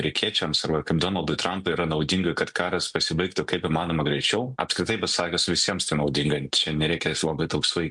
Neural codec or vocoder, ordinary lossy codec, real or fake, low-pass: none; MP3, 64 kbps; real; 10.8 kHz